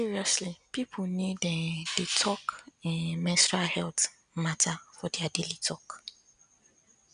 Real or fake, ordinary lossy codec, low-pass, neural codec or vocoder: real; none; 9.9 kHz; none